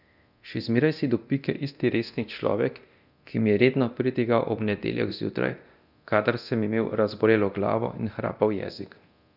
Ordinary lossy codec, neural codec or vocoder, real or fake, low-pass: none; codec, 24 kHz, 0.9 kbps, DualCodec; fake; 5.4 kHz